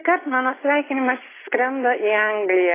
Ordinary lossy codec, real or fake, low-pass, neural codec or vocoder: AAC, 16 kbps; real; 3.6 kHz; none